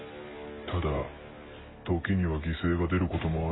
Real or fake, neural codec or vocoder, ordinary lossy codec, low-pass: real; none; AAC, 16 kbps; 7.2 kHz